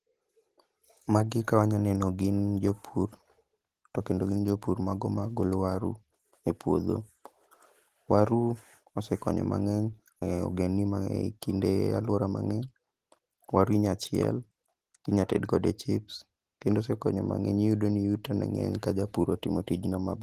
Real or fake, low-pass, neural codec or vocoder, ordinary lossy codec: real; 14.4 kHz; none; Opus, 16 kbps